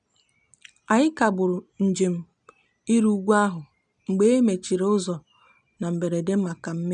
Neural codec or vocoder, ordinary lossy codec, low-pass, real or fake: none; none; 9.9 kHz; real